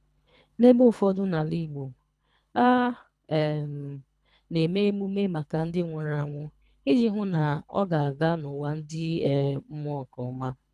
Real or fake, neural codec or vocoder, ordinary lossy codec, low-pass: fake; codec, 24 kHz, 3 kbps, HILCodec; none; none